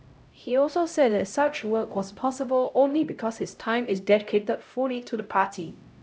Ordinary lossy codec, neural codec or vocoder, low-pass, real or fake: none; codec, 16 kHz, 0.5 kbps, X-Codec, HuBERT features, trained on LibriSpeech; none; fake